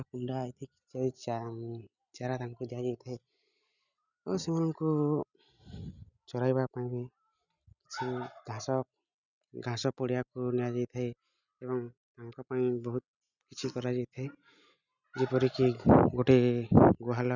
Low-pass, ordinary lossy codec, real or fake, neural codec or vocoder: 7.2 kHz; none; real; none